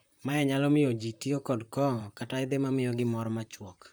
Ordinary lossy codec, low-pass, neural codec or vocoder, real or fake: none; none; vocoder, 44.1 kHz, 128 mel bands, Pupu-Vocoder; fake